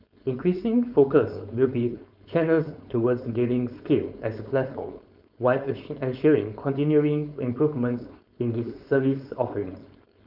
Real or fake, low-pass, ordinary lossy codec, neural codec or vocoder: fake; 5.4 kHz; none; codec, 16 kHz, 4.8 kbps, FACodec